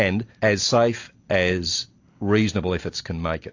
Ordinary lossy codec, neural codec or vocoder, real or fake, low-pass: AAC, 48 kbps; none; real; 7.2 kHz